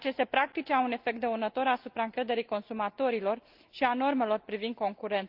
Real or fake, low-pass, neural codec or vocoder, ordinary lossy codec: real; 5.4 kHz; none; Opus, 32 kbps